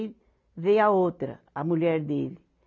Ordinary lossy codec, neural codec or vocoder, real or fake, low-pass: none; none; real; 7.2 kHz